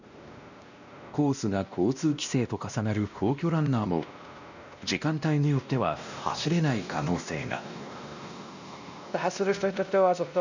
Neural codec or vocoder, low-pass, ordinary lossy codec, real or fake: codec, 16 kHz, 1 kbps, X-Codec, WavLM features, trained on Multilingual LibriSpeech; 7.2 kHz; none; fake